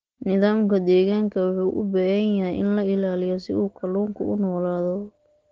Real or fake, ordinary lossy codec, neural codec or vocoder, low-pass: real; Opus, 16 kbps; none; 7.2 kHz